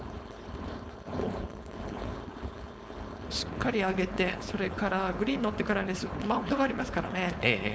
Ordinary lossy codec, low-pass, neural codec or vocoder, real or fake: none; none; codec, 16 kHz, 4.8 kbps, FACodec; fake